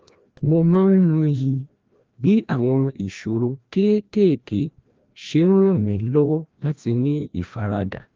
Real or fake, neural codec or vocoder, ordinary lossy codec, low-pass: fake; codec, 16 kHz, 1 kbps, FreqCodec, larger model; Opus, 24 kbps; 7.2 kHz